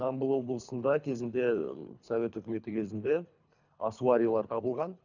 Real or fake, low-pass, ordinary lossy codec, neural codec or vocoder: fake; 7.2 kHz; none; codec, 24 kHz, 3 kbps, HILCodec